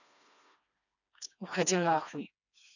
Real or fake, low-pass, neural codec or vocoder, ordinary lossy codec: fake; 7.2 kHz; codec, 16 kHz, 2 kbps, FreqCodec, smaller model; none